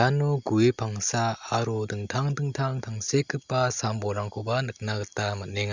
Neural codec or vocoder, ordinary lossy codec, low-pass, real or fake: none; Opus, 64 kbps; 7.2 kHz; real